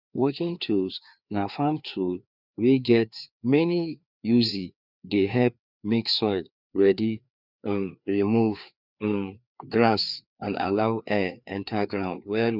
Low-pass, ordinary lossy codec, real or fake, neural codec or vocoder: 5.4 kHz; none; fake; codec, 16 kHz, 2 kbps, FreqCodec, larger model